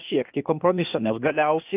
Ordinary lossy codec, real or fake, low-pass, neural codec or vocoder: Opus, 24 kbps; fake; 3.6 kHz; codec, 16 kHz, 0.8 kbps, ZipCodec